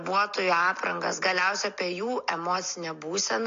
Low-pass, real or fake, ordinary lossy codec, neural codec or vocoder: 7.2 kHz; real; AAC, 48 kbps; none